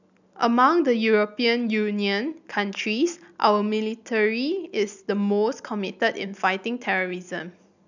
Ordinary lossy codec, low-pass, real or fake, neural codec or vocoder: none; 7.2 kHz; real; none